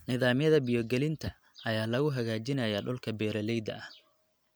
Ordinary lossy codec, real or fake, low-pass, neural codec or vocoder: none; real; none; none